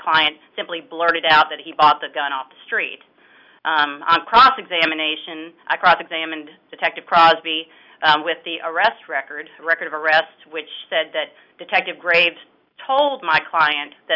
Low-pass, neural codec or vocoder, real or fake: 5.4 kHz; none; real